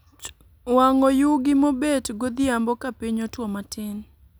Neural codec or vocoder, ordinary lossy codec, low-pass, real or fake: none; none; none; real